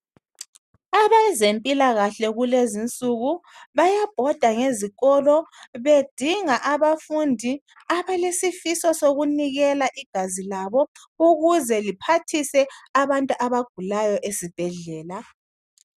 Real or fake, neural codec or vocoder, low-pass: real; none; 14.4 kHz